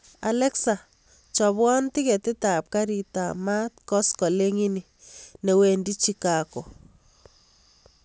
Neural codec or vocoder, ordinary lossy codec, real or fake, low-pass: none; none; real; none